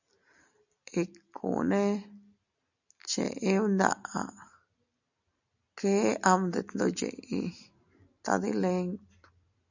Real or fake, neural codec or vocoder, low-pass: real; none; 7.2 kHz